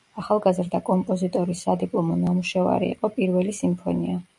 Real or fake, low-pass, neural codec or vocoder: real; 10.8 kHz; none